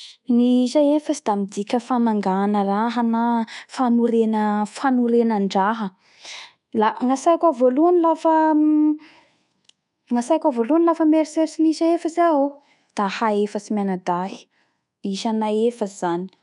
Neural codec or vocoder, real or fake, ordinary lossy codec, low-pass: codec, 24 kHz, 1.2 kbps, DualCodec; fake; none; 10.8 kHz